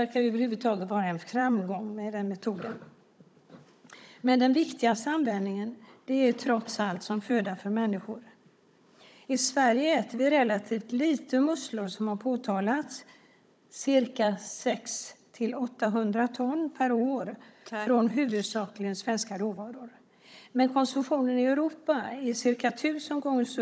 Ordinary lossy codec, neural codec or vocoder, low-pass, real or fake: none; codec, 16 kHz, 16 kbps, FunCodec, trained on Chinese and English, 50 frames a second; none; fake